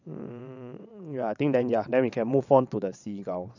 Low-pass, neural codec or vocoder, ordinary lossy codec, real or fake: 7.2 kHz; vocoder, 22.05 kHz, 80 mel bands, WaveNeXt; none; fake